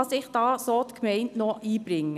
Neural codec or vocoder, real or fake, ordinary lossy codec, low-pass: none; real; none; 14.4 kHz